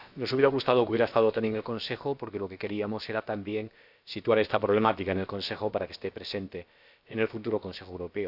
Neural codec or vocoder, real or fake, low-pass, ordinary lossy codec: codec, 16 kHz, about 1 kbps, DyCAST, with the encoder's durations; fake; 5.4 kHz; Opus, 64 kbps